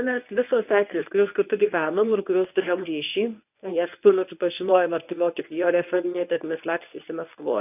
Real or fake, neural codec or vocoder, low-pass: fake; codec, 24 kHz, 0.9 kbps, WavTokenizer, medium speech release version 1; 3.6 kHz